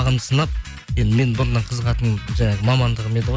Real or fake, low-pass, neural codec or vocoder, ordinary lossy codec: real; none; none; none